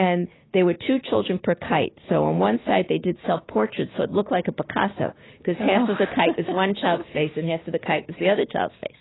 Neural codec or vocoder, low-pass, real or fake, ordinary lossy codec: codec, 16 kHz, 6 kbps, DAC; 7.2 kHz; fake; AAC, 16 kbps